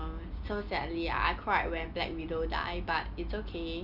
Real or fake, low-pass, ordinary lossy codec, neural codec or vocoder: real; 5.4 kHz; none; none